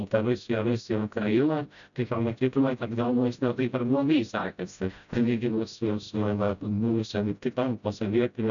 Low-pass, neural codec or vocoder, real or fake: 7.2 kHz; codec, 16 kHz, 0.5 kbps, FreqCodec, smaller model; fake